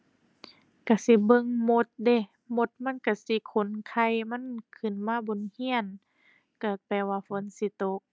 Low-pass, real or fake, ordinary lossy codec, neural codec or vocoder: none; real; none; none